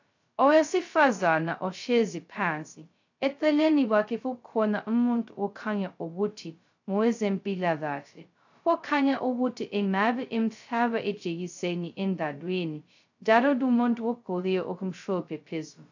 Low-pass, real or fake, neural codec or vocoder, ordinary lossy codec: 7.2 kHz; fake; codec, 16 kHz, 0.2 kbps, FocalCodec; AAC, 48 kbps